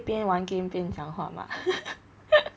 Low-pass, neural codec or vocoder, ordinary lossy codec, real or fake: none; none; none; real